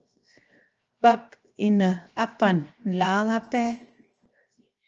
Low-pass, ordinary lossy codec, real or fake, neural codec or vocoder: 7.2 kHz; Opus, 24 kbps; fake; codec, 16 kHz, 0.7 kbps, FocalCodec